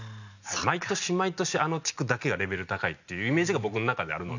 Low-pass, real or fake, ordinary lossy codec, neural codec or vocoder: 7.2 kHz; real; none; none